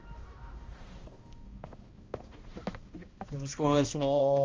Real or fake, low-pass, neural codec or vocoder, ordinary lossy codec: fake; 7.2 kHz; codec, 16 kHz, 1 kbps, X-Codec, HuBERT features, trained on balanced general audio; Opus, 32 kbps